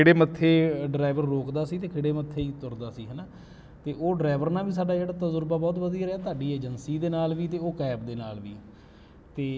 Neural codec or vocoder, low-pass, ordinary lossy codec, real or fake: none; none; none; real